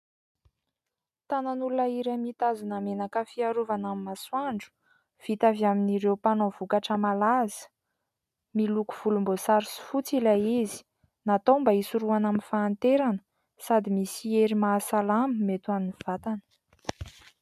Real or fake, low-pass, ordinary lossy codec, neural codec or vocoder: real; 14.4 kHz; MP3, 96 kbps; none